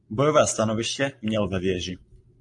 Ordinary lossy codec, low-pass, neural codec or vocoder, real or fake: AAC, 64 kbps; 10.8 kHz; none; real